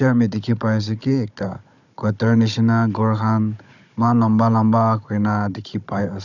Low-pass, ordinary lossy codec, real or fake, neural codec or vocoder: 7.2 kHz; none; fake; codec, 16 kHz, 16 kbps, FunCodec, trained on Chinese and English, 50 frames a second